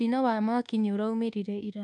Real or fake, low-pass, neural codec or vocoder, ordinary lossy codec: fake; none; codec, 24 kHz, 1.2 kbps, DualCodec; none